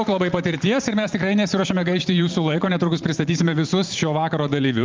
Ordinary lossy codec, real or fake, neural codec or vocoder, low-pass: Opus, 32 kbps; fake; vocoder, 44.1 kHz, 128 mel bands every 512 samples, BigVGAN v2; 7.2 kHz